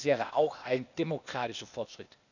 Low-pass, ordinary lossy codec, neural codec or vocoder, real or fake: 7.2 kHz; none; codec, 16 kHz, 0.8 kbps, ZipCodec; fake